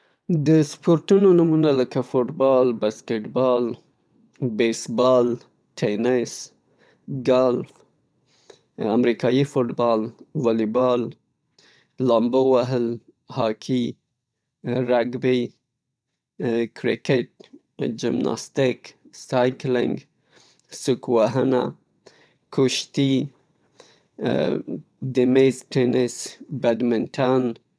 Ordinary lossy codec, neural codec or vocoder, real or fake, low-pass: none; vocoder, 22.05 kHz, 80 mel bands, WaveNeXt; fake; none